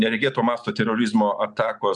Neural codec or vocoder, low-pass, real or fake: none; 10.8 kHz; real